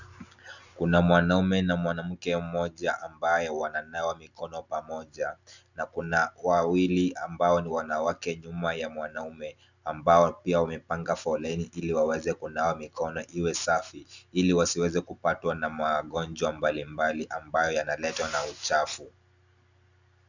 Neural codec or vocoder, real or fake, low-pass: none; real; 7.2 kHz